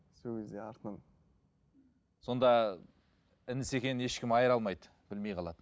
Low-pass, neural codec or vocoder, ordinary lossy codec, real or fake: none; none; none; real